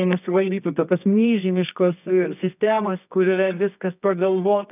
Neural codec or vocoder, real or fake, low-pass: codec, 24 kHz, 0.9 kbps, WavTokenizer, medium music audio release; fake; 3.6 kHz